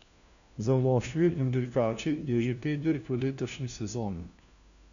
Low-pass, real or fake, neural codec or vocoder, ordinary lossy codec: 7.2 kHz; fake; codec, 16 kHz, 1 kbps, FunCodec, trained on LibriTTS, 50 frames a second; none